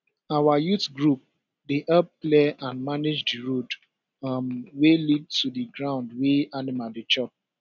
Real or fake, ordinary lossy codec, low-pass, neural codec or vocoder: real; none; 7.2 kHz; none